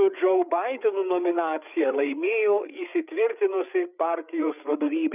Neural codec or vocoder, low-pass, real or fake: codec, 16 kHz, 8 kbps, FreqCodec, larger model; 3.6 kHz; fake